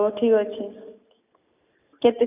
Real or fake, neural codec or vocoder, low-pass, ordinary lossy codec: real; none; 3.6 kHz; none